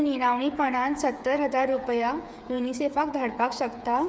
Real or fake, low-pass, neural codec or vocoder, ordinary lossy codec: fake; none; codec, 16 kHz, 8 kbps, FreqCodec, smaller model; none